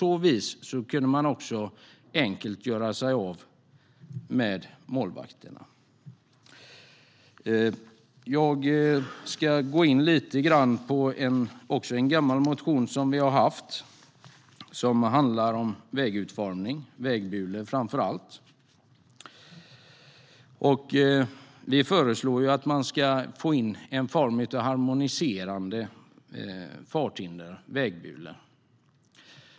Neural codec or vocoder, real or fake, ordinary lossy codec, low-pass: none; real; none; none